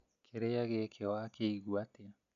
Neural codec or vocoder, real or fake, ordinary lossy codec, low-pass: none; real; MP3, 96 kbps; 7.2 kHz